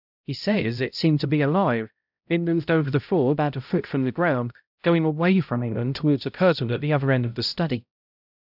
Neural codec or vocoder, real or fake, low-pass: codec, 16 kHz, 0.5 kbps, X-Codec, HuBERT features, trained on balanced general audio; fake; 5.4 kHz